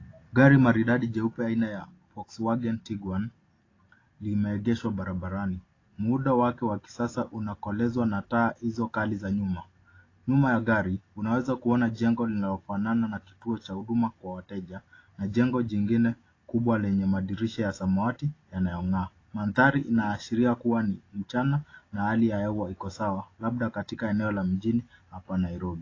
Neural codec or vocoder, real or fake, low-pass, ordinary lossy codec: none; real; 7.2 kHz; AAC, 32 kbps